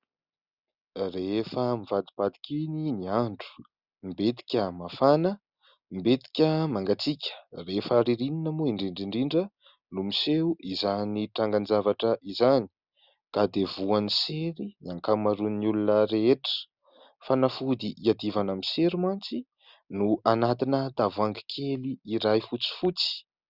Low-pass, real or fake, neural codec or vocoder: 5.4 kHz; real; none